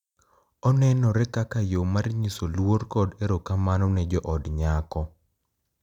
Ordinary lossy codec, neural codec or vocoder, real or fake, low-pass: none; none; real; 19.8 kHz